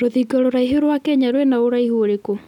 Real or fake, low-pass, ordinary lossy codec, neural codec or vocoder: real; 19.8 kHz; none; none